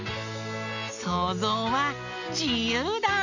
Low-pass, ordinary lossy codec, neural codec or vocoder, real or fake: 7.2 kHz; none; none; real